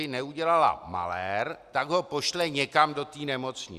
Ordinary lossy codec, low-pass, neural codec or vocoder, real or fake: Opus, 64 kbps; 14.4 kHz; none; real